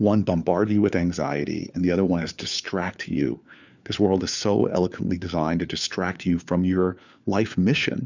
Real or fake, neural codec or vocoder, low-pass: fake; codec, 16 kHz, 4 kbps, FunCodec, trained on LibriTTS, 50 frames a second; 7.2 kHz